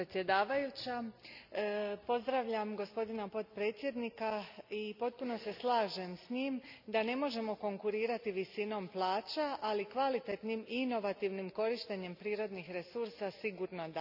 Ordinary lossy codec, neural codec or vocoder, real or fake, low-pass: none; none; real; 5.4 kHz